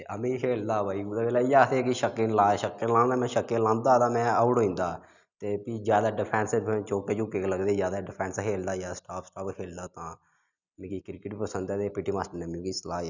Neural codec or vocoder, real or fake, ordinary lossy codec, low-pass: none; real; none; 7.2 kHz